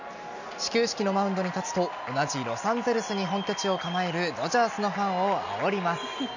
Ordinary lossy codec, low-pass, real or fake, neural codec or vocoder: none; 7.2 kHz; real; none